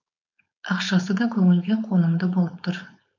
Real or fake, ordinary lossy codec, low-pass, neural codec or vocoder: fake; none; 7.2 kHz; codec, 16 kHz, 4.8 kbps, FACodec